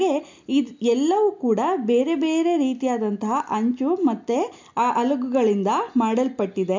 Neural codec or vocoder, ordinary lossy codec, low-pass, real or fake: none; MP3, 64 kbps; 7.2 kHz; real